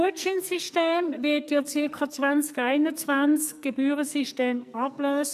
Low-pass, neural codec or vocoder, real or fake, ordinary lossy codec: 14.4 kHz; codec, 44.1 kHz, 2.6 kbps, SNAC; fake; none